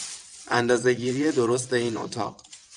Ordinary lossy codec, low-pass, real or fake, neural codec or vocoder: MP3, 96 kbps; 9.9 kHz; fake; vocoder, 22.05 kHz, 80 mel bands, WaveNeXt